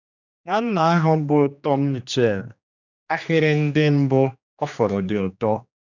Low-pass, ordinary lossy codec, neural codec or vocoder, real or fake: 7.2 kHz; none; codec, 16 kHz, 2 kbps, X-Codec, HuBERT features, trained on general audio; fake